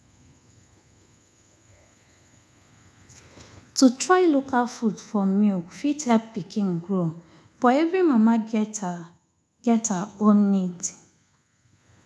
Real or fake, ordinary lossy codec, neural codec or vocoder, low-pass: fake; none; codec, 24 kHz, 1.2 kbps, DualCodec; none